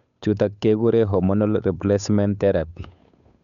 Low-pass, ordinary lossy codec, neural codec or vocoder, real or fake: 7.2 kHz; none; codec, 16 kHz, 8 kbps, FunCodec, trained on Chinese and English, 25 frames a second; fake